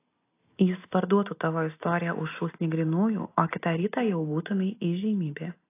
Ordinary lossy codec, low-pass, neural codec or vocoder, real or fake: AAC, 24 kbps; 3.6 kHz; none; real